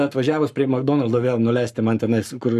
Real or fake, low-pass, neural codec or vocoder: fake; 14.4 kHz; codec, 44.1 kHz, 7.8 kbps, Pupu-Codec